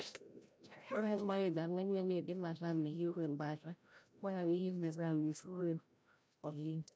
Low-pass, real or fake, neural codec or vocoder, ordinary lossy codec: none; fake; codec, 16 kHz, 0.5 kbps, FreqCodec, larger model; none